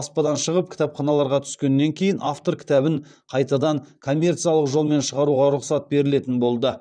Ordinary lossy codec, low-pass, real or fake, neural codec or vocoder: none; 9.9 kHz; fake; vocoder, 44.1 kHz, 128 mel bands, Pupu-Vocoder